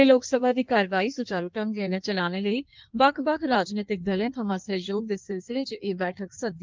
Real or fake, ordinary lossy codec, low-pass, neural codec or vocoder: fake; Opus, 32 kbps; 7.2 kHz; codec, 16 kHz in and 24 kHz out, 1.1 kbps, FireRedTTS-2 codec